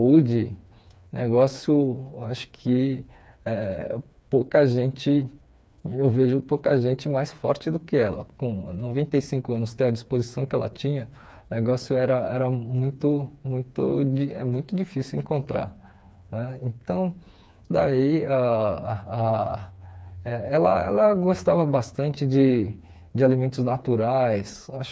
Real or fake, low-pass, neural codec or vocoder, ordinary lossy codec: fake; none; codec, 16 kHz, 4 kbps, FreqCodec, smaller model; none